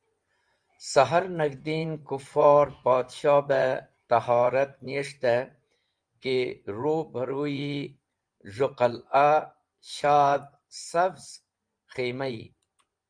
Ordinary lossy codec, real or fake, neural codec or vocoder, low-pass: Opus, 32 kbps; fake; vocoder, 24 kHz, 100 mel bands, Vocos; 9.9 kHz